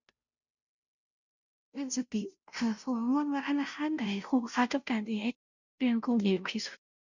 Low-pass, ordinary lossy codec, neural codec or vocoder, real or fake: 7.2 kHz; none; codec, 16 kHz, 0.5 kbps, FunCodec, trained on Chinese and English, 25 frames a second; fake